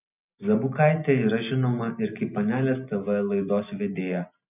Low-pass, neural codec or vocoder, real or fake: 3.6 kHz; none; real